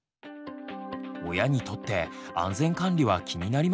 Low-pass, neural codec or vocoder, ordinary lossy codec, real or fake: none; none; none; real